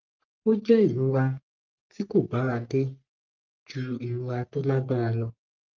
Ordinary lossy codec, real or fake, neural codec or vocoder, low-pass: Opus, 24 kbps; fake; codec, 44.1 kHz, 3.4 kbps, Pupu-Codec; 7.2 kHz